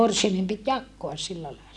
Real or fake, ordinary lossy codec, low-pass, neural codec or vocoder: real; none; none; none